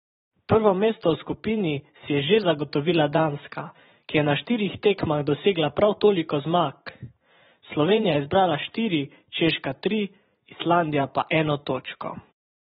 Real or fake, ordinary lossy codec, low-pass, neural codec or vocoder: fake; AAC, 16 kbps; 19.8 kHz; vocoder, 44.1 kHz, 128 mel bands every 512 samples, BigVGAN v2